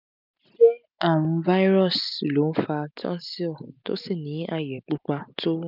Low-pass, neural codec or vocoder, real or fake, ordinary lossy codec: 5.4 kHz; none; real; none